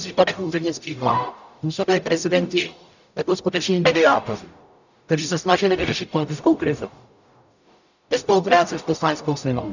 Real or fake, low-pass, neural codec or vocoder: fake; 7.2 kHz; codec, 44.1 kHz, 0.9 kbps, DAC